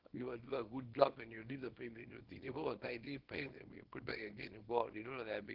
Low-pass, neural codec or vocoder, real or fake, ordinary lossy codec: 5.4 kHz; codec, 24 kHz, 0.9 kbps, WavTokenizer, small release; fake; Opus, 16 kbps